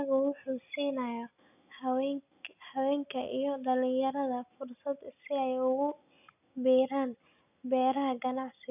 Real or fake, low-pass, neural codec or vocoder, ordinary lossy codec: real; 3.6 kHz; none; none